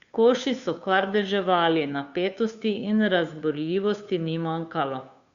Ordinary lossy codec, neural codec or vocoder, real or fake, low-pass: Opus, 64 kbps; codec, 16 kHz, 2 kbps, FunCodec, trained on Chinese and English, 25 frames a second; fake; 7.2 kHz